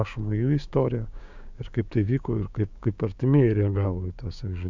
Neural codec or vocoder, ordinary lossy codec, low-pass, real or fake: codec, 16 kHz, 6 kbps, DAC; MP3, 64 kbps; 7.2 kHz; fake